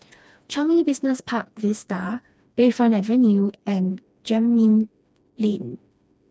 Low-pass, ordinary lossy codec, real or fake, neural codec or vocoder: none; none; fake; codec, 16 kHz, 2 kbps, FreqCodec, smaller model